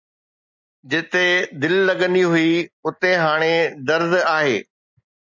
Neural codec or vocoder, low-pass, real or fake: none; 7.2 kHz; real